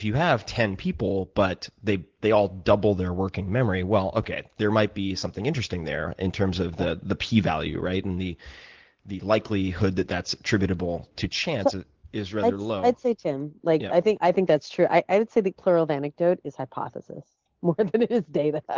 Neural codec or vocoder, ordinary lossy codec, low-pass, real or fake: none; Opus, 16 kbps; 7.2 kHz; real